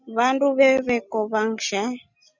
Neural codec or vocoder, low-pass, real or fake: none; 7.2 kHz; real